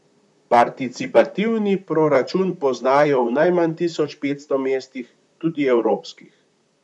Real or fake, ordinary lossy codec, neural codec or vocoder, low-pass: fake; none; vocoder, 44.1 kHz, 128 mel bands, Pupu-Vocoder; 10.8 kHz